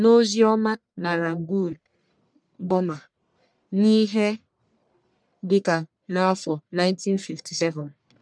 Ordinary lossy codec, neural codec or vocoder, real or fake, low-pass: none; codec, 44.1 kHz, 1.7 kbps, Pupu-Codec; fake; 9.9 kHz